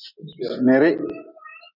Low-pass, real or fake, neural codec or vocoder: 5.4 kHz; real; none